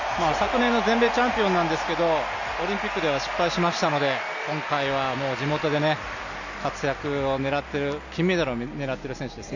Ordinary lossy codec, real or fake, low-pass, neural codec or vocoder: none; real; 7.2 kHz; none